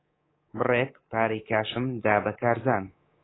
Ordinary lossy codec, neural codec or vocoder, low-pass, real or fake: AAC, 16 kbps; codec, 24 kHz, 3.1 kbps, DualCodec; 7.2 kHz; fake